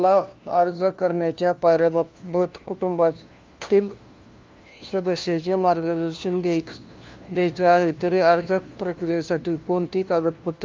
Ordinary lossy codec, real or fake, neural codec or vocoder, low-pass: Opus, 24 kbps; fake; codec, 16 kHz, 1 kbps, FunCodec, trained on LibriTTS, 50 frames a second; 7.2 kHz